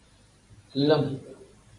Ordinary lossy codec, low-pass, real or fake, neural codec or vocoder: MP3, 48 kbps; 10.8 kHz; fake; vocoder, 44.1 kHz, 128 mel bands every 512 samples, BigVGAN v2